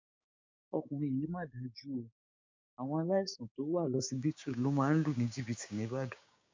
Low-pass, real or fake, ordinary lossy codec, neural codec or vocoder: 7.2 kHz; fake; MP3, 64 kbps; codec, 16 kHz, 6 kbps, DAC